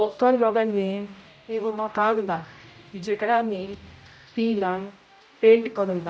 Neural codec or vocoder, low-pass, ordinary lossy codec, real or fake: codec, 16 kHz, 0.5 kbps, X-Codec, HuBERT features, trained on general audio; none; none; fake